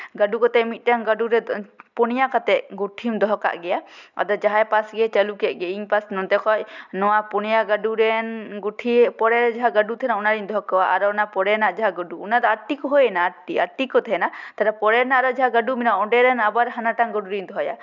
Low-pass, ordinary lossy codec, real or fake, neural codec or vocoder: 7.2 kHz; none; real; none